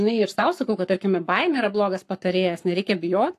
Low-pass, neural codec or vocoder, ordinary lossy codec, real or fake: 14.4 kHz; codec, 44.1 kHz, 7.8 kbps, Pupu-Codec; AAC, 64 kbps; fake